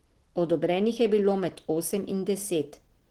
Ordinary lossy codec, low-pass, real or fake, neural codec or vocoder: Opus, 16 kbps; 19.8 kHz; real; none